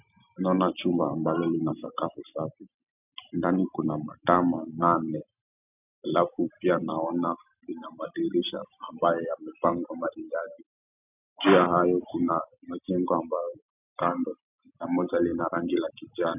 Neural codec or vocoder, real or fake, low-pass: none; real; 3.6 kHz